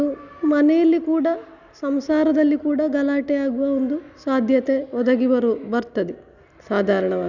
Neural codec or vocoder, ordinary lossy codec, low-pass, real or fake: none; none; 7.2 kHz; real